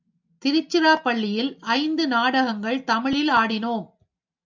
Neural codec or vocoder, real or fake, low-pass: none; real; 7.2 kHz